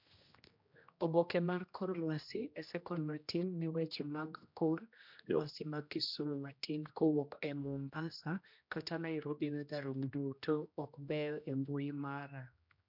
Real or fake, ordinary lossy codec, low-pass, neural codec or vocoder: fake; MP3, 48 kbps; 5.4 kHz; codec, 16 kHz, 1 kbps, X-Codec, HuBERT features, trained on general audio